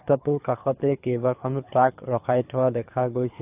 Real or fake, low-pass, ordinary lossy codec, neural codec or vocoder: fake; 3.6 kHz; AAC, 32 kbps; codec, 24 kHz, 3 kbps, HILCodec